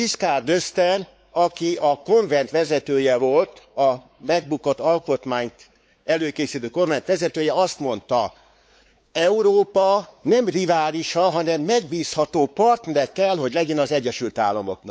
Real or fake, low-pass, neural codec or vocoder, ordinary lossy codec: fake; none; codec, 16 kHz, 4 kbps, X-Codec, WavLM features, trained on Multilingual LibriSpeech; none